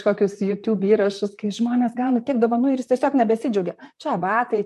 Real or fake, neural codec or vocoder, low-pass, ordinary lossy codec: fake; vocoder, 44.1 kHz, 128 mel bands, Pupu-Vocoder; 14.4 kHz; MP3, 64 kbps